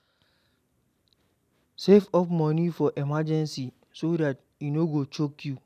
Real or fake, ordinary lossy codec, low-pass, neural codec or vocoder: real; none; 14.4 kHz; none